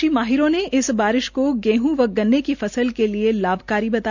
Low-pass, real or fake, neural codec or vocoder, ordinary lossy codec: 7.2 kHz; real; none; none